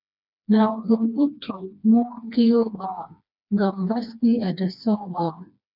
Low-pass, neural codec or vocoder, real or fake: 5.4 kHz; codec, 16 kHz, 2 kbps, FreqCodec, smaller model; fake